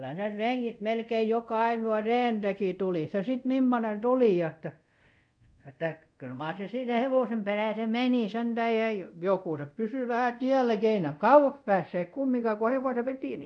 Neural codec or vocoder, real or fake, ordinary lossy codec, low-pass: codec, 24 kHz, 0.5 kbps, DualCodec; fake; none; 9.9 kHz